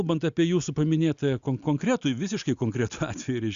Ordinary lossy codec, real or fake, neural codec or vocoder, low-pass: Opus, 64 kbps; real; none; 7.2 kHz